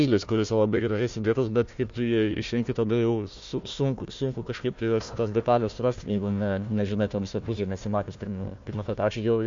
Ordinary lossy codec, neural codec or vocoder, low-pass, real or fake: MP3, 48 kbps; codec, 16 kHz, 1 kbps, FunCodec, trained on Chinese and English, 50 frames a second; 7.2 kHz; fake